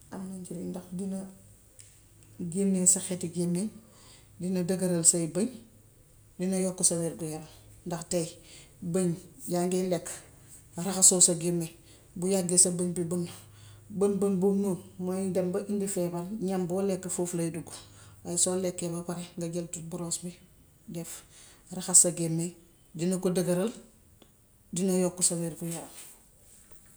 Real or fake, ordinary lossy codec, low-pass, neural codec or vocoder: real; none; none; none